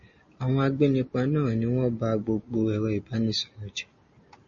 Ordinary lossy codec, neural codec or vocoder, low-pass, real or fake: MP3, 32 kbps; none; 7.2 kHz; real